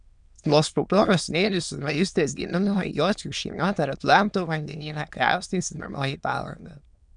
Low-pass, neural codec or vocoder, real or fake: 9.9 kHz; autoencoder, 22.05 kHz, a latent of 192 numbers a frame, VITS, trained on many speakers; fake